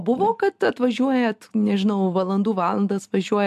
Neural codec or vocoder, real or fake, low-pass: none; real; 14.4 kHz